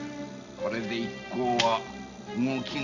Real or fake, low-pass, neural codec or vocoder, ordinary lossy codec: real; 7.2 kHz; none; none